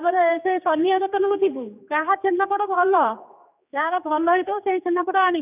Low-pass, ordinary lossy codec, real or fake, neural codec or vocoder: 3.6 kHz; none; fake; codec, 16 kHz, 2 kbps, X-Codec, HuBERT features, trained on general audio